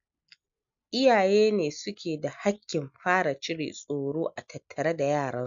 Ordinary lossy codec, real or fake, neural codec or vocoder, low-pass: none; real; none; 7.2 kHz